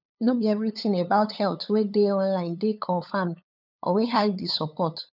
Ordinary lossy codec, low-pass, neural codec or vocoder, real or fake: none; 5.4 kHz; codec, 16 kHz, 8 kbps, FunCodec, trained on LibriTTS, 25 frames a second; fake